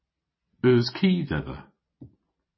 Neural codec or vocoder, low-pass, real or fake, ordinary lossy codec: none; 7.2 kHz; real; MP3, 24 kbps